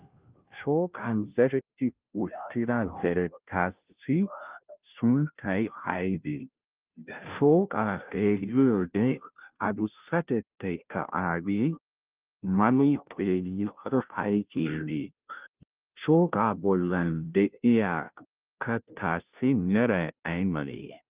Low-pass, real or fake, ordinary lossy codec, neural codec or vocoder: 3.6 kHz; fake; Opus, 24 kbps; codec, 16 kHz, 0.5 kbps, FunCodec, trained on Chinese and English, 25 frames a second